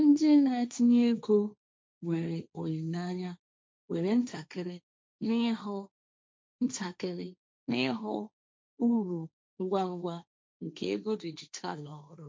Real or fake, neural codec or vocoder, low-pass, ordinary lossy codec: fake; codec, 16 kHz, 1.1 kbps, Voila-Tokenizer; none; none